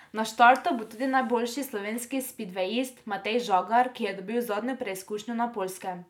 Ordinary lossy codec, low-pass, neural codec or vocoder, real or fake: none; 19.8 kHz; none; real